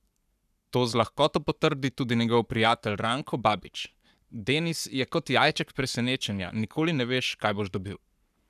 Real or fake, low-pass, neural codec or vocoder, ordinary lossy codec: fake; 14.4 kHz; codec, 44.1 kHz, 7.8 kbps, Pupu-Codec; none